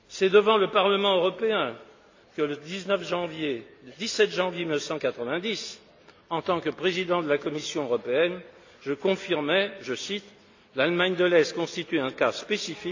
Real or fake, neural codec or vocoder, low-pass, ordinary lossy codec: real; none; 7.2 kHz; AAC, 48 kbps